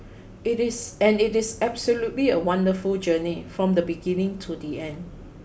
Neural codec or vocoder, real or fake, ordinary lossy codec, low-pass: none; real; none; none